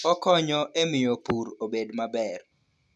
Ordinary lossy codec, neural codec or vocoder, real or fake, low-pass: none; none; real; none